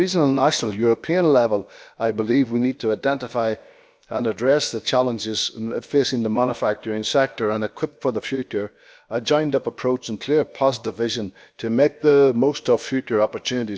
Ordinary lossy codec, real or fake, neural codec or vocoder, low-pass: none; fake; codec, 16 kHz, about 1 kbps, DyCAST, with the encoder's durations; none